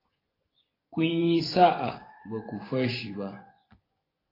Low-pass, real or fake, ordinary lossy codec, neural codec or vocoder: 5.4 kHz; fake; AAC, 24 kbps; vocoder, 24 kHz, 100 mel bands, Vocos